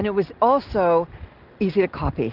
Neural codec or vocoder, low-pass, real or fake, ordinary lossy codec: none; 5.4 kHz; real; Opus, 32 kbps